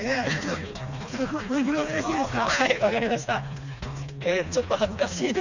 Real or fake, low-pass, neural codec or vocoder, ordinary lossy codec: fake; 7.2 kHz; codec, 16 kHz, 2 kbps, FreqCodec, smaller model; none